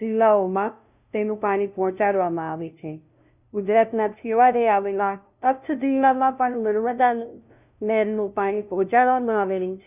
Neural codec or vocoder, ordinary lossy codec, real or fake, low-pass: codec, 16 kHz, 0.5 kbps, FunCodec, trained on LibriTTS, 25 frames a second; none; fake; 3.6 kHz